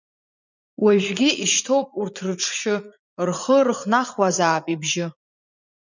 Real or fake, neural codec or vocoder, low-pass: fake; vocoder, 44.1 kHz, 80 mel bands, Vocos; 7.2 kHz